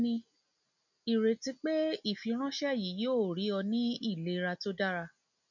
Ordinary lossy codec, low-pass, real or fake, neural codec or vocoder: none; 7.2 kHz; real; none